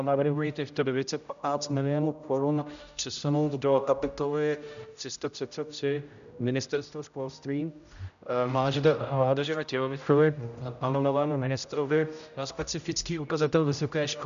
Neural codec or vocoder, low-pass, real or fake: codec, 16 kHz, 0.5 kbps, X-Codec, HuBERT features, trained on general audio; 7.2 kHz; fake